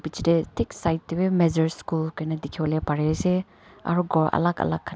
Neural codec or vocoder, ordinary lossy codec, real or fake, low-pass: none; none; real; none